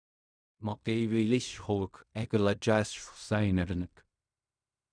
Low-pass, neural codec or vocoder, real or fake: 9.9 kHz; codec, 16 kHz in and 24 kHz out, 0.4 kbps, LongCat-Audio-Codec, fine tuned four codebook decoder; fake